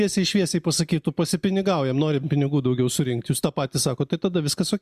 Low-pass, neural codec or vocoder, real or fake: 14.4 kHz; none; real